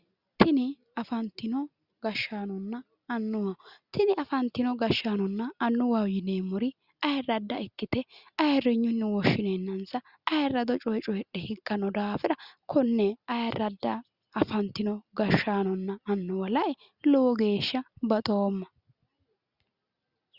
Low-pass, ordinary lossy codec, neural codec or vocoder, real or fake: 5.4 kHz; Opus, 64 kbps; none; real